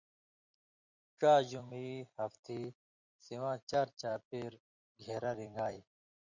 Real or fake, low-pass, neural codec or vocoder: fake; 7.2 kHz; vocoder, 44.1 kHz, 128 mel bands every 256 samples, BigVGAN v2